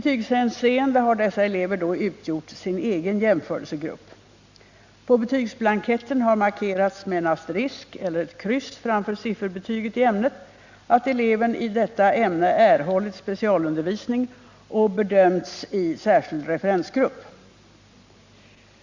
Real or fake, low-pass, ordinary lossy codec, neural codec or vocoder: real; 7.2 kHz; Opus, 64 kbps; none